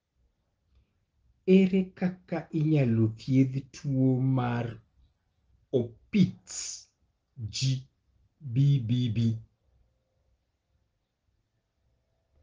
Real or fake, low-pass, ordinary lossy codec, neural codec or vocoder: real; 7.2 kHz; Opus, 16 kbps; none